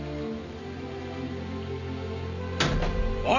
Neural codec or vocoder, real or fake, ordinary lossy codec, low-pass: none; real; none; 7.2 kHz